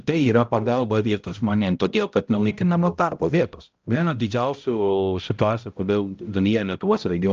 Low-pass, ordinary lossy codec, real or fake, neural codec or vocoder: 7.2 kHz; Opus, 24 kbps; fake; codec, 16 kHz, 0.5 kbps, X-Codec, HuBERT features, trained on balanced general audio